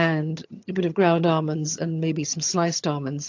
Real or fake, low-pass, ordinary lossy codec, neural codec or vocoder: fake; 7.2 kHz; AAC, 48 kbps; vocoder, 22.05 kHz, 80 mel bands, HiFi-GAN